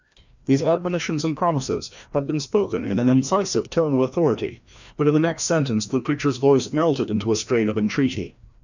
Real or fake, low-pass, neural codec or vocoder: fake; 7.2 kHz; codec, 16 kHz, 1 kbps, FreqCodec, larger model